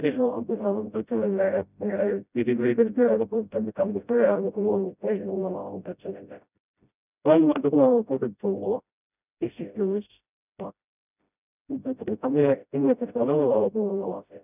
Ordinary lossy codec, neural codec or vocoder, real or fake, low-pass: none; codec, 16 kHz, 0.5 kbps, FreqCodec, smaller model; fake; 3.6 kHz